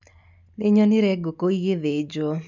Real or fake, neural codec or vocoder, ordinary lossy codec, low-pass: real; none; none; 7.2 kHz